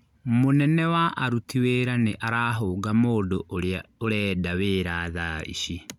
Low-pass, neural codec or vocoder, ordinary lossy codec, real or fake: 19.8 kHz; none; none; real